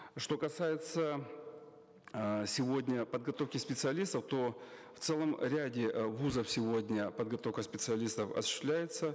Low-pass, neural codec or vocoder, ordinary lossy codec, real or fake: none; none; none; real